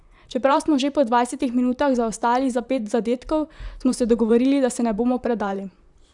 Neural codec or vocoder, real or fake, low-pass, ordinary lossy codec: vocoder, 44.1 kHz, 128 mel bands, Pupu-Vocoder; fake; 10.8 kHz; none